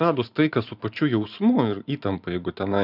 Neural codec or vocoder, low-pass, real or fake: vocoder, 44.1 kHz, 128 mel bands every 512 samples, BigVGAN v2; 5.4 kHz; fake